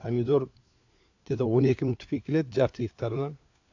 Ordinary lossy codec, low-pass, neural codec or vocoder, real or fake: AAC, 48 kbps; 7.2 kHz; codec, 16 kHz, 4 kbps, FunCodec, trained on LibriTTS, 50 frames a second; fake